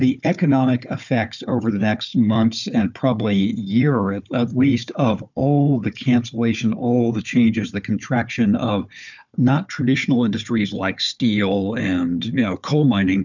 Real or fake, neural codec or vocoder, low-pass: fake; codec, 16 kHz, 4 kbps, FunCodec, trained on Chinese and English, 50 frames a second; 7.2 kHz